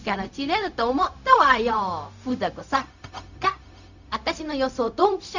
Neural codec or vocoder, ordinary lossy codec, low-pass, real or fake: codec, 16 kHz, 0.4 kbps, LongCat-Audio-Codec; none; 7.2 kHz; fake